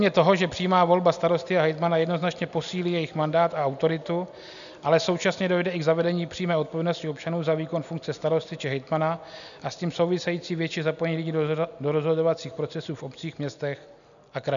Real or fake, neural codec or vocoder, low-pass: real; none; 7.2 kHz